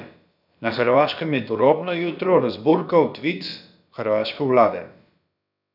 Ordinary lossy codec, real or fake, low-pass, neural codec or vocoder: none; fake; 5.4 kHz; codec, 16 kHz, about 1 kbps, DyCAST, with the encoder's durations